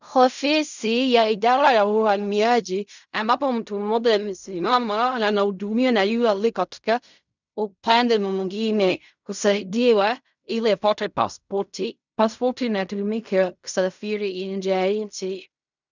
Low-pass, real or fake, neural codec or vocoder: 7.2 kHz; fake; codec, 16 kHz in and 24 kHz out, 0.4 kbps, LongCat-Audio-Codec, fine tuned four codebook decoder